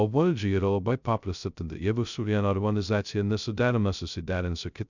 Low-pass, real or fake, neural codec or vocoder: 7.2 kHz; fake; codec, 16 kHz, 0.2 kbps, FocalCodec